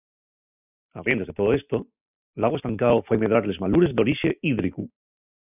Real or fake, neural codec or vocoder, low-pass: real; none; 3.6 kHz